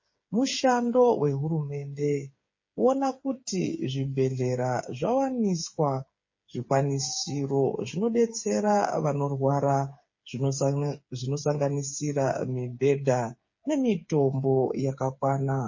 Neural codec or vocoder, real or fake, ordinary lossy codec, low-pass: codec, 16 kHz, 8 kbps, FreqCodec, smaller model; fake; MP3, 32 kbps; 7.2 kHz